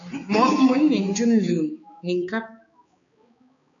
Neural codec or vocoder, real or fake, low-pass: codec, 16 kHz, 4 kbps, X-Codec, HuBERT features, trained on balanced general audio; fake; 7.2 kHz